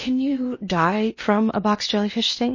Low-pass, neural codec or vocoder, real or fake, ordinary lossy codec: 7.2 kHz; codec, 16 kHz in and 24 kHz out, 0.8 kbps, FocalCodec, streaming, 65536 codes; fake; MP3, 32 kbps